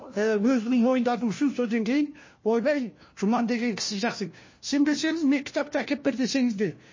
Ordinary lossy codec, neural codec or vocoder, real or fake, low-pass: MP3, 32 kbps; codec, 16 kHz, 1 kbps, FunCodec, trained on LibriTTS, 50 frames a second; fake; 7.2 kHz